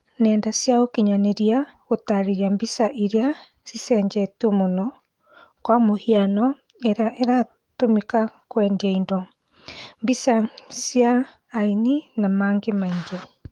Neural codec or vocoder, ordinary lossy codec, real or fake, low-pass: autoencoder, 48 kHz, 128 numbers a frame, DAC-VAE, trained on Japanese speech; Opus, 24 kbps; fake; 19.8 kHz